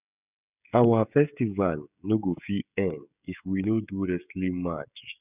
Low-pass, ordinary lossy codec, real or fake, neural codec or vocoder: 3.6 kHz; none; fake; codec, 16 kHz, 16 kbps, FreqCodec, smaller model